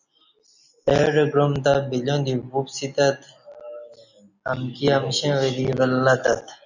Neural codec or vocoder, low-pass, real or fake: none; 7.2 kHz; real